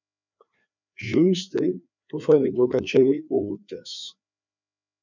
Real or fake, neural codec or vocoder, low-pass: fake; codec, 16 kHz, 2 kbps, FreqCodec, larger model; 7.2 kHz